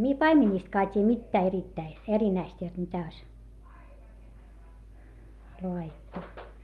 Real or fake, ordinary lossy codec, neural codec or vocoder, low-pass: real; Opus, 32 kbps; none; 19.8 kHz